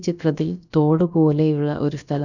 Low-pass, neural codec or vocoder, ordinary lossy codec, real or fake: 7.2 kHz; codec, 16 kHz, about 1 kbps, DyCAST, with the encoder's durations; none; fake